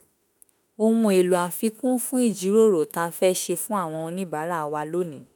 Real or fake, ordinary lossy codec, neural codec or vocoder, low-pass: fake; none; autoencoder, 48 kHz, 32 numbers a frame, DAC-VAE, trained on Japanese speech; none